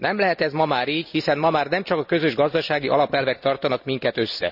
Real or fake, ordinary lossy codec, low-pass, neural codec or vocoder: real; none; 5.4 kHz; none